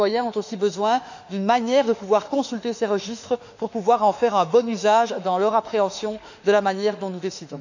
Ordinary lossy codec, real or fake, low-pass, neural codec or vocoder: none; fake; 7.2 kHz; autoencoder, 48 kHz, 32 numbers a frame, DAC-VAE, trained on Japanese speech